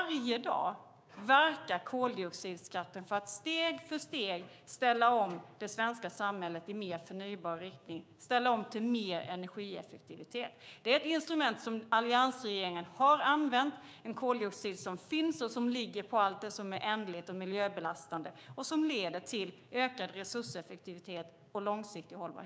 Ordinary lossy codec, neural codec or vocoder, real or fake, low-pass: none; codec, 16 kHz, 6 kbps, DAC; fake; none